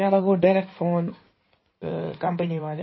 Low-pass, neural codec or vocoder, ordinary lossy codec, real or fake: 7.2 kHz; codec, 16 kHz in and 24 kHz out, 2.2 kbps, FireRedTTS-2 codec; MP3, 24 kbps; fake